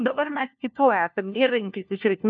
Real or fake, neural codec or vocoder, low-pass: fake; codec, 16 kHz, 1 kbps, FunCodec, trained on LibriTTS, 50 frames a second; 7.2 kHz